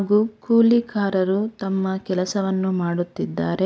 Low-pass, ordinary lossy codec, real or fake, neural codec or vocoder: none; none; real; none